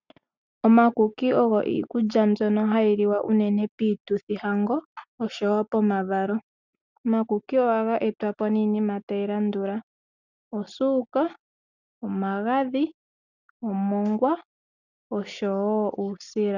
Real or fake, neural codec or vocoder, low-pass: real; none; 7.2 kHz